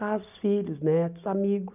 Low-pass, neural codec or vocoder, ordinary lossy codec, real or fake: 3.6 kHz; none; none; real